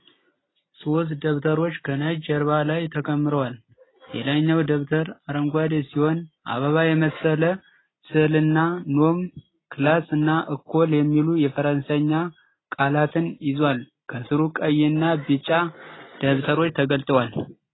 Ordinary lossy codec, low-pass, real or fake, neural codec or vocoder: AAC, 16 kbps; 7.2 kHz; real; none